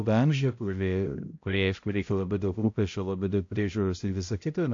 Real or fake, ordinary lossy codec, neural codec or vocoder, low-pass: fake; AAC, 48 kbps; codec, 16 kHz, 0.5 kbps, X-Codec, HuBERT features, trained on balanced general audio; 7.2 kHz